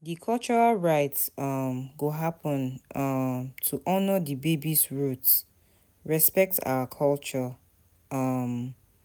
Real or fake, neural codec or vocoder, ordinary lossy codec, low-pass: real; none; none; none